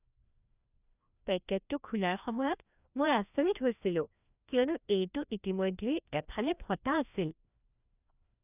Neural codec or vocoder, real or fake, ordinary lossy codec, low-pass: codec, 16 kHz, 1 kbps, FreqCodec, larger model; fake; none; 3.6 kHz